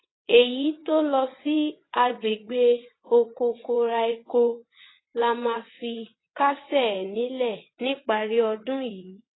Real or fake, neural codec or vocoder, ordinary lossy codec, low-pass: fake; vocoder, 22.05 kHz, 80 mel bands, WaveNeXt; AAC, 16 kbps; 7.2 kHz